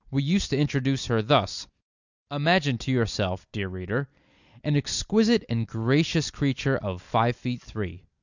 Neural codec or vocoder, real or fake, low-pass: none; real; 7.2 kHz